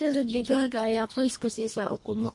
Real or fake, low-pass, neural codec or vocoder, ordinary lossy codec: fake; 10.8 kHz; codec, 24 kHz, 1.5 kbps, HILCodec; MP3, 48 kbps